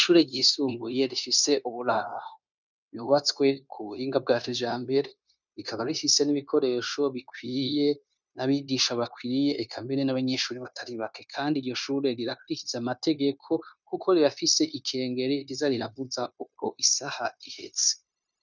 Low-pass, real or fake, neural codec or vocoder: 7.2 kHz; fake; codec, 16 kHz, 0.9 kbps, LongCat-Audio-Codec